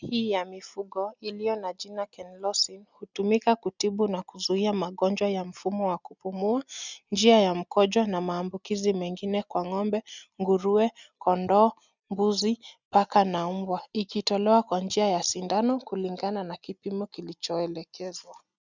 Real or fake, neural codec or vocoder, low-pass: real; none; 7.2 kHz